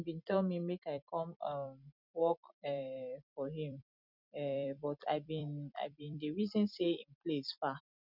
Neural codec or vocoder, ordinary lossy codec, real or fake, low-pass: vocoder, 44.1 kHz, 128 mel bands every 256 samples, BigVGAN v2; none; fake; 5.4 kHz